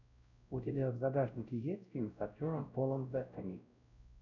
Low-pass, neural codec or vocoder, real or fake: 7.2 kHz; codec, 16 kHz, 0.5 kbps, X-Codec, WavLM features, trained on Multilingual LibriSpeech; fake